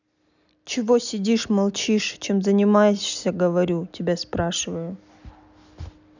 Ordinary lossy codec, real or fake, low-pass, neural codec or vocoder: none; real; 7.2 kHz; none